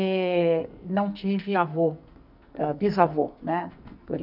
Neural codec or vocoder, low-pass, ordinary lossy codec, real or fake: codec, 44.1 kHz, 2.6 kbps, SNAC; 5.4 kHz; none; fake